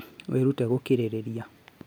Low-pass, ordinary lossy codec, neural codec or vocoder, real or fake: none; none; none; real